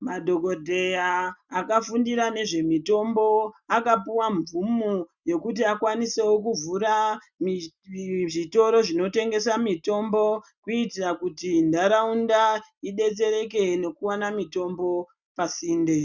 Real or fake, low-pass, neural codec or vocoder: real; 7.2 kHz; none